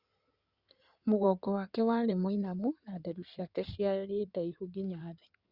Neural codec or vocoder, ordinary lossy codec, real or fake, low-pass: codec, 16 kHz in and 24 kHz out, 2.2 kbps, FireRedTTS-2 codec; Opus, 64 kbps; fake; 5.4 kHz